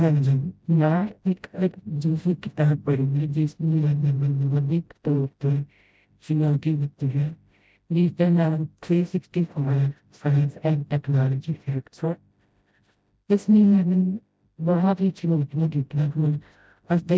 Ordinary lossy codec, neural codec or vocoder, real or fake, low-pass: none; codec, 16 kHz, 0.5 kbps, FreqCodec, smaller model; fake; none